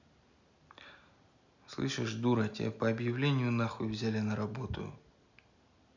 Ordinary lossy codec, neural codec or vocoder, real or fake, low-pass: none; none; real; 7.2 kHz